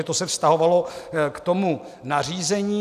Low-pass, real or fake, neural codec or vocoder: 14.4 kHz; real; none